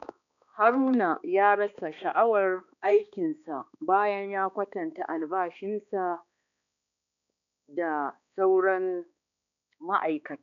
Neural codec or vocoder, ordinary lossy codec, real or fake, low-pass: codec, 16 kHz, 2 kbps, X-Codec, HuBERT features, trained on balanced general audio; none; fake; 7.2 kHz